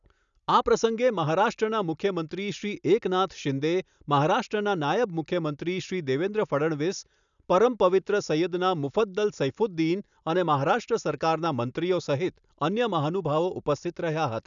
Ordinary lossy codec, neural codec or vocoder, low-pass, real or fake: none; none; 7.2 kHz; real